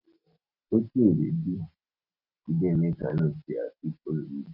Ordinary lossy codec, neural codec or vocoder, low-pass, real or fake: none; none; 5.4 kHz; real